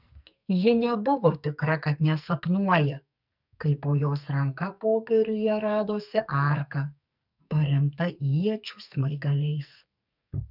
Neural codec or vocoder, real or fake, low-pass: codec, 44.1 kHz, 2.6 kbps, SNAC; fake; 5.4 kHz